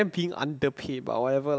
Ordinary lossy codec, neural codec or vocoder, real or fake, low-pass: none; none; real; none